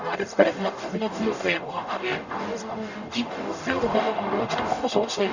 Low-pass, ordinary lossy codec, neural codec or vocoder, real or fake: 7.2 kHz; none; codec, 44.1 kHz, 0.9 kbps, DAC; fake